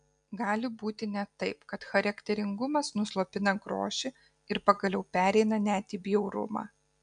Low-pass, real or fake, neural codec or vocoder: 9.9 kHz; real; none